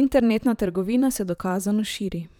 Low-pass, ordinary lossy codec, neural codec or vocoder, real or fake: 19.8 kHz; none; vocoder, 44.1 kHz, 128 mel bands, Pupu-Vocoder; fake